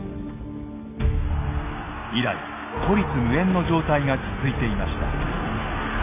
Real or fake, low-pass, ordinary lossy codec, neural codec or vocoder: real; 3.6 kHz; none; none